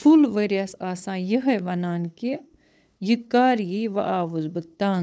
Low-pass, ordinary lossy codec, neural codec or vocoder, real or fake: none; none; codec, 16 kHz, 8 kbps, FunCodec, trained on LibriTTS, 25 frames a second; fake